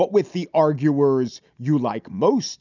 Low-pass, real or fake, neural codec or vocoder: 7.2 kHz; real; none